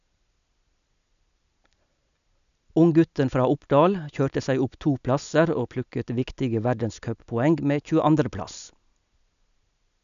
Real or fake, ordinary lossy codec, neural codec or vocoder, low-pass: real; none; none; 7.2 kHz